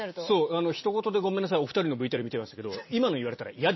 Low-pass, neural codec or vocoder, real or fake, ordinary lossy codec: 7.2 kHz; none; real; MP3, 24 kbps